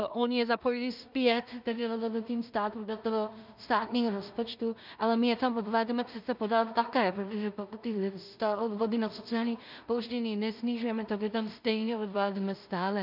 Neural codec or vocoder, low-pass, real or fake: codec, 16 kHz in and 24 kHz out, 0.4 kbps, LongCat-Audio-Codec, two codebook decoder; 5.4 kHz; fake